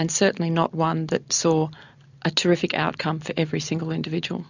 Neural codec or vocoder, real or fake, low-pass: none; real; 7.2 kHz